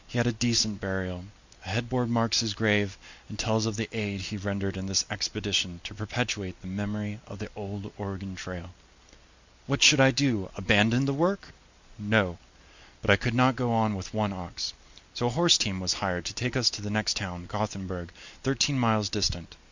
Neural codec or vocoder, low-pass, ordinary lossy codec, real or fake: none; 7.2 kHz; Opus, 64 kbps; real